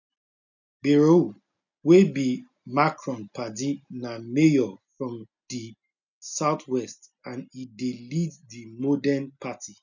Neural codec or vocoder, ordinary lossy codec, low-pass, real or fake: none; none; 7.2 kHz; real